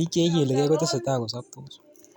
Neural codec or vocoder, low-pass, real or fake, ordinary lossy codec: none; 19.8 kHz; real; none